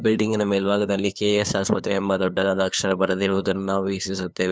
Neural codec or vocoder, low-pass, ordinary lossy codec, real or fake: codec, 16 kHz, 2 kbps, FunCodec, trained on LibriTTS, 25 frames a second; none; none; fake